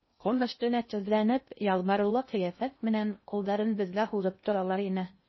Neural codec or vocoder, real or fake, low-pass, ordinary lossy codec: codec, 16 kHz in and 24 kHz out, 0.6 kbps, FocalCodec, streaming, 2048 codes; fake; 7.2 kHz; MP3, 24 kbps